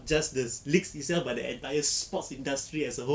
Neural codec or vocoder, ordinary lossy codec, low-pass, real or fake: none; none; none; real